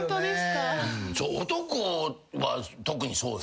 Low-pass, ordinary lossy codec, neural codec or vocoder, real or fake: none; none; none; real